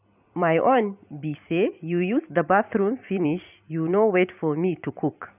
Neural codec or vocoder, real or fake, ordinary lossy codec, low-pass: vocoder, 44.1 kHz, 128 mel bands every 256 samples, BigVGAN v2; fake; none; 3.6 kHz